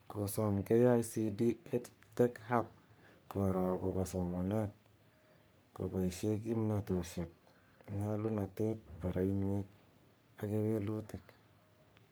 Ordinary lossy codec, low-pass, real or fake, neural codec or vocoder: none; none; fake; codec, 44.1 kHz, 3.4 kbps, Pupu-Codec